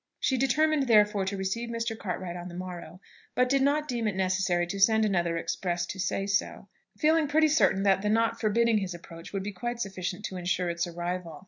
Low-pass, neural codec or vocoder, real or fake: 7.2 kHz; none; real